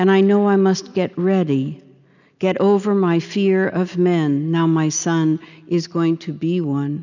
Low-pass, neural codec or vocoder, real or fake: 7.2 kHz; none; real